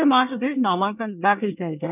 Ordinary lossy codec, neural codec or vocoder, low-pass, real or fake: none; codec, 24 kHz, 1 kbps, SNAC; 3.6 kHz; fake